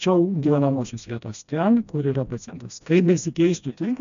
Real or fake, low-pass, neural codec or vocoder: fake; 7.2 kHz; codec, 16 kHz, 1 kbps, FreqCodec, smaller model